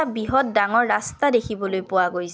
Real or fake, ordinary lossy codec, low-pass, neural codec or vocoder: real; none; none; none